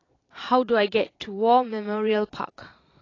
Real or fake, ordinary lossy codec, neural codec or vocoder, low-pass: fake; AAC, 32 kbps; vocoder, 22.05 kHz, 80 mel bands, Vocos; 7.2 kHz